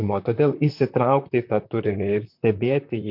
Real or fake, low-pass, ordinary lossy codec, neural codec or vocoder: fake; 5.4 kHz; MP3, 48 kbps; vocoder, 44.1 kHz, 128 mel bands, Pupu-Vocoder